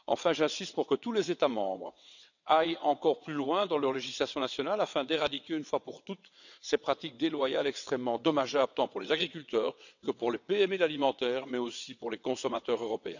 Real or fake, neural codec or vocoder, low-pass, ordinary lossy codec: fake; vocoder, 22.05 kHz, 80 mel bands, WaveNeXt; 7.2 kHz; none